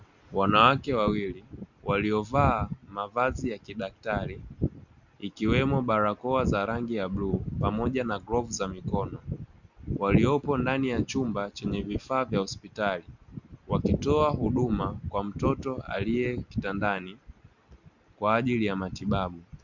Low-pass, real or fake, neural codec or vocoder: 7.2 kHz; real; none